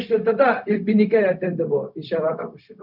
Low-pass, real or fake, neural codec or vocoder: 5.4 kHz; fake; codec, 16 kHz, 0.4 kbps, LongCat-Audio-Codec